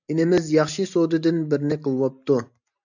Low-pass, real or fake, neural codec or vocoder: 7.2 kHz; real; none